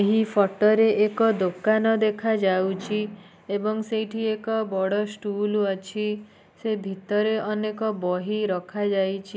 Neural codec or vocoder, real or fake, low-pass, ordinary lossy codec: none; real; none; none